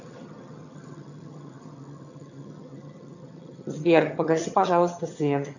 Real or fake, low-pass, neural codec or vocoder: fake; 7.2 kHz; vocoder, 22.05 kHz, 80 mel bands, HiFi-GAN